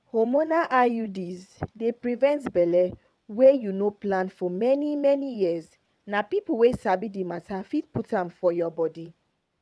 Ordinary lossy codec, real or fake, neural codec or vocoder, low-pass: none; fake; vocoder, 22.05 kHz, 80 mel bands, WaveNeXt; none